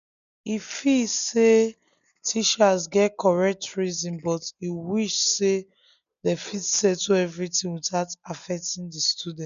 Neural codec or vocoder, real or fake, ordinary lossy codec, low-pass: none; real; none; 7.2 kHz